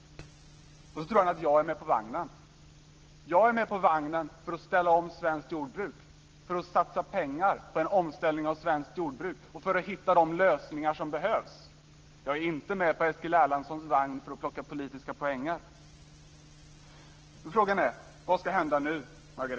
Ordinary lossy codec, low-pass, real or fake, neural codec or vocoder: Opus, 16 kbps; 7.2 kHz; real; none